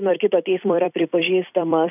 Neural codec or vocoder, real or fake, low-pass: vocoder, 44.1 kHz, 128 mel bands every 256 samples, BigVGAN v2; fake; 3.6 kHz